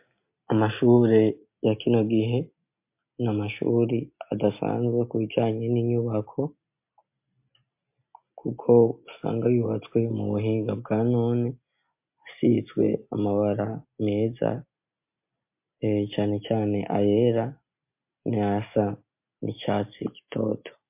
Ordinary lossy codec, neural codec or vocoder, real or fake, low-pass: MP3, 32 kbps; none; real; 3.6 kHz